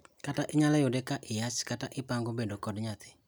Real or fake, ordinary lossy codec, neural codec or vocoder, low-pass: real; none; none; none